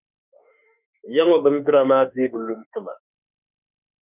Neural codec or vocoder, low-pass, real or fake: autoencoder, 48 kHz, 32 numbers a frame, DAC-VAE, trained on Japanese speech; 3.6 kHz; fake